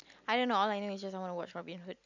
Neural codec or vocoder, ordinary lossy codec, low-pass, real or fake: none; none; 7.2 kHz; real